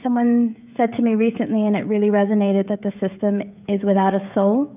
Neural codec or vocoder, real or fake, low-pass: codec, 16 kHz, 16 kbps, FreqCodec, smaller model; fake; 3.6 kHz